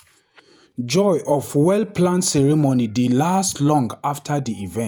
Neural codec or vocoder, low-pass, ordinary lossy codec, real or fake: vocoder, 48 kHz, 128 mel bands, Vocos; none; none; fake